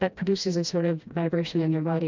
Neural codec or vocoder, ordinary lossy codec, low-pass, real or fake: codec, 16 kHz, 1 kbps, FreqCodec, smaller model; MP3, 64 kbps; 7.2 kHz; fake